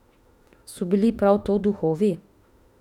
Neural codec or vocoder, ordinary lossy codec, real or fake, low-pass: autoencoder, 48 kHz, 32 numbers a frame, DAC-VAE, trained on Japanese speech; none; fake; 19.8 kHz